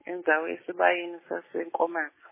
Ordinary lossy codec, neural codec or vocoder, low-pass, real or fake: MP3, 16 kbps; none; 3.6 kHz; real